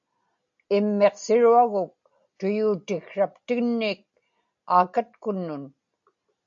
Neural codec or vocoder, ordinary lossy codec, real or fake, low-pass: none; AAC, 64 kbps; real; 7.2 kHz